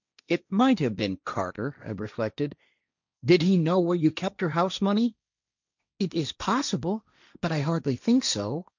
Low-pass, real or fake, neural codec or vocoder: 7.2 kHz; fake; codec, 16 kHz, 1.1 kbps, Voila-Tokenizer